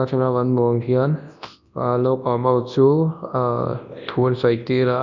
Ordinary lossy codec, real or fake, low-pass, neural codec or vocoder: none; fake; 7.2 kHz; codec, 24 kHz, 0.9 kbps, WavTokenizer, large speech release